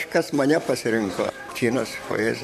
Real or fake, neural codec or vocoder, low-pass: fake; vocoder, 44.1 kHz, 128 mel bands, Pupu-Vocoder; 14.4 kHz